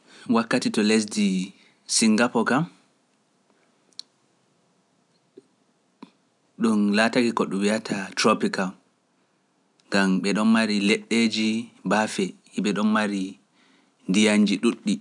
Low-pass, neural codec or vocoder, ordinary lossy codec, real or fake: 10.8 kHz; none; none; real